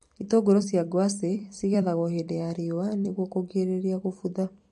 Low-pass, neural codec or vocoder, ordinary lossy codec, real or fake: 14.4 kHz; vocoder, 44.1 kHz, 128 mel bands every 256 samples, BigVGAN v2; MP3, 48 kbps; fake